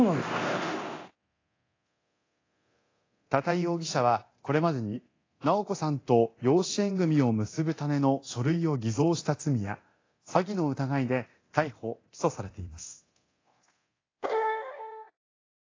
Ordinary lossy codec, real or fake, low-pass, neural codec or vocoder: AAC, 32 kbps; fake; 7.2 kHz; codec, 24 kHz, 0.9 kbps, DualCodec